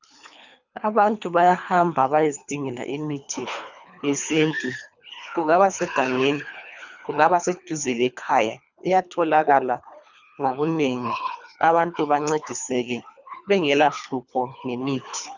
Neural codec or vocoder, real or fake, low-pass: codec, 24 kHz, 3 kbps, HILCodec; fake; 7.2 kHz